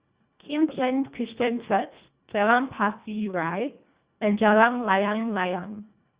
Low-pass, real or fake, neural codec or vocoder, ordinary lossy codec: 3.6 kHz; fake; codec, 24 kHz, 1.5 kbps, HILCodec; Opus, 64 kbps